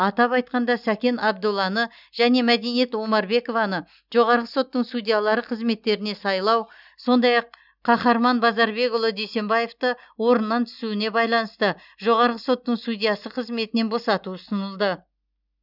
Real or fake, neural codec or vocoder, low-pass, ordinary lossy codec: real; none; 5.4 kHz; none